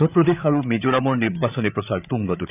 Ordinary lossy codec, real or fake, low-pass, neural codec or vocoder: none; fake; 3.6 kHz; codec, 16 kHz, 8 kbps, FreqCodec, larger model